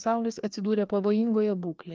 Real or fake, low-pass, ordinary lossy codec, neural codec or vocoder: fake; 7.2 kHz; Opus, 24 kbps; codec, 16 kHz, 2 kbps, FreqCodec, larger model